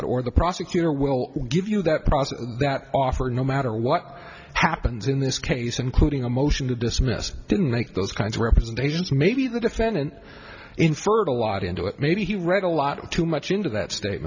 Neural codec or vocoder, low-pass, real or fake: none; 7.2 kHz; real